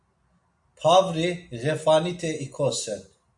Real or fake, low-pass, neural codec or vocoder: real; 10.8 kHz; none